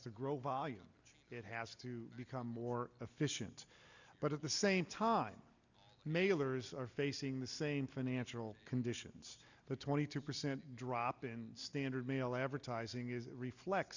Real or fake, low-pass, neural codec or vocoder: real; 7.2 kHz; none